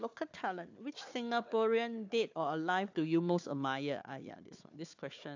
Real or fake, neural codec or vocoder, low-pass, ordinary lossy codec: fake; codec, 16 kHz, 4 kbps, FunCodec, trained on Chinese and English, 50 frames a second; 7.2 kHz; AAC, 48 kbps